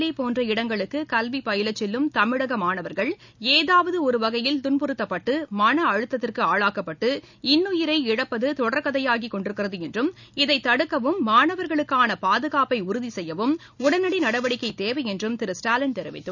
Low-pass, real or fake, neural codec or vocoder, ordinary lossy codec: 7.2 kHz; real; none; none